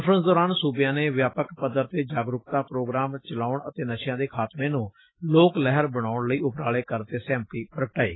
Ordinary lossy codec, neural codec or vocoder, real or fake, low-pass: AAC, 16 kbps; none; real; 7.2 kHz